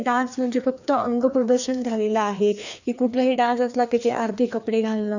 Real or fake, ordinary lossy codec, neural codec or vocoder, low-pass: fake; none; codec, 16 kHz, 2 kbps, FreqCodec, larger model; 7.2 kHz